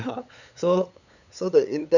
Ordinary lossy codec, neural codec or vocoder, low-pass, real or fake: none; codec, 16 kHz, 8 kbps, FunCodec, trained on LibriTTS, 25 frames a second; 7.2 kHz; fake